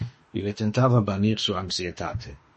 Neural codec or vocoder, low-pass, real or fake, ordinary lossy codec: autoencoder, 48 kHz, 32 numbers a frame, DAC-VAE, trained on Japanese speech; 10.8 kHz; fake; MP3, 32 kbps